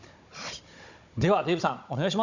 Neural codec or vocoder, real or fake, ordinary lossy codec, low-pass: codec, 16 kHz, 16 kbps, FunCodec, trained on Chinese and English, 50 frames a second; fake; none; 7.2 kHz